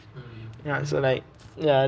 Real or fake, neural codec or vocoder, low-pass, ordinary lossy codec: real; none; none; none